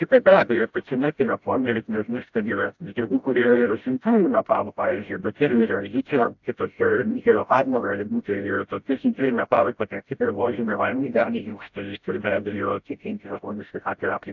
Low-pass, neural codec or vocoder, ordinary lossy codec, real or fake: 7.2 kHz; codec, 16 kHz, 0.5 kbps, FreqCodec, smaller model; AAC, 48 kbps; fake